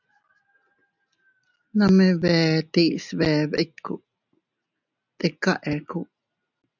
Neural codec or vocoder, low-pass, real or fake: none; 7.2 kHz; real